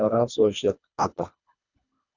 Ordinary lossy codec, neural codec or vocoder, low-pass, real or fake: none; codec, 24 kHz, 1.5 kbps, HILCodec; 7.2 kHz; fake